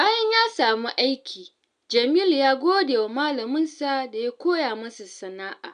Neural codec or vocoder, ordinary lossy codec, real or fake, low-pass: none; AAC, 96 kbps; real; 9.9 kHz